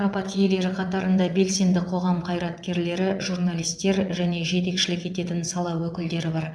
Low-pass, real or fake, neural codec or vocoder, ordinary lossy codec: none; fake; vocoder, 22.05 kHz, 80 mel bands, WaveNeXt; none